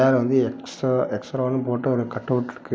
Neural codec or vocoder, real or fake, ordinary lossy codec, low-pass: none; real; none; none